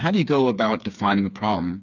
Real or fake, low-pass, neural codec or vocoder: fake; 7.2 kHz; codec, 16 kHz, 4 kbps, FreqCodec, smaller model